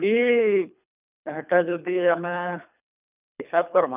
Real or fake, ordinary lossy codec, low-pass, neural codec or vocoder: fake; none; 3.6 kHz; codec, 24 kHz, 3 kbps, HILCodec